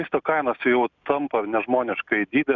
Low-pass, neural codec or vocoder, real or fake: 7.2 kHz; none; real